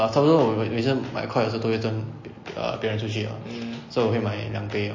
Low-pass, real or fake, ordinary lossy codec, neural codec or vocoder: 7.2 kHz; real; MP3, 32 kbps; none